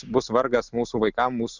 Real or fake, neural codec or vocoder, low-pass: real; none; 7.2 kHz